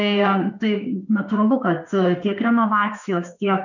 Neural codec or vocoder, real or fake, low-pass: autoencoder, 48 kHz, 32 numbers a frame, DAC-VAE, trained on Japanese speech; fake; 7.2 kHz